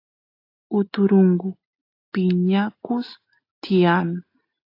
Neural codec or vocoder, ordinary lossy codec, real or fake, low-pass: none; AAC, 32 kbps; real; 5.4 kHz